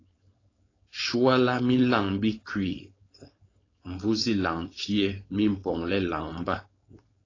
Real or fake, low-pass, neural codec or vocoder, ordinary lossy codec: fake; 7.2 kHz; codec, 16 kHz, 4.8 kbps, FACodec; AAC, 32 kbps